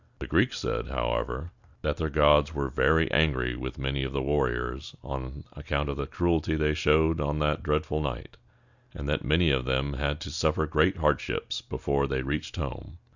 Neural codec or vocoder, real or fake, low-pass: none; real; 7.2 kHz